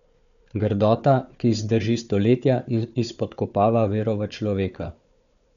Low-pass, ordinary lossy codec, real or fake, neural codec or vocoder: 7.2 kHz; none; fake; codec, 16 kHz, 4 kbps, FunCodec, trained on Chinese and English, 50 frames a second